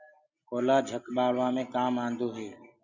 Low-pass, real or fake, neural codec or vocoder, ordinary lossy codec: 7.2 kHz; real; none; Opus, 64 kbps